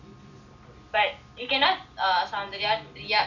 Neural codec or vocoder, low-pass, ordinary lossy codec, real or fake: none; 7.2 kHz; none; real